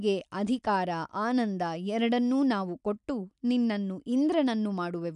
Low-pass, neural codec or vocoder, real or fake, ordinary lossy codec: 10.8 kHz; none; real; none